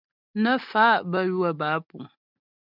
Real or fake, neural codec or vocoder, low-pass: real; none; 5.4 kHz